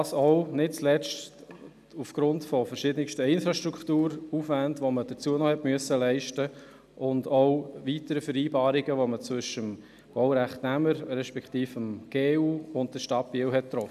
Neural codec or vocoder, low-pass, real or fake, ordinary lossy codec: none; 14.4 kHz; real; none